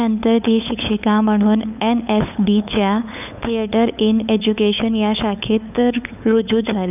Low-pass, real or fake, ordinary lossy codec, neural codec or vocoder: 3.6 kHz; fake; none; codec, 16 kHz, 8 kbps, FunCodec, trained on LibriTTS, 25 frames a second